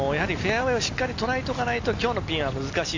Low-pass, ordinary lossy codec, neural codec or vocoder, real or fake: 7.2 kHz; AAC, 48 kbps; none; real